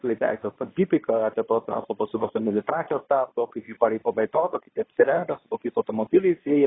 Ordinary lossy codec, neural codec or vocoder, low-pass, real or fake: AAC, 16 kbps; codec, 24 kHz, 0.9 kbps, WavTokenizer, medium speech release version 2; 7.2 kHz; fake